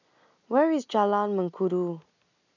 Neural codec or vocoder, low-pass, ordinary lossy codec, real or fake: none; 7.2 kHz; none; real